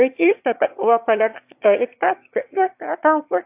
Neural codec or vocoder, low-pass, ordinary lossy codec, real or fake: autoencoder, 22.05 kHz, a latent of 192 numbers a frame, VITS, trained on one speaker; 3.6 kHz; AAC, 32 kbps; fake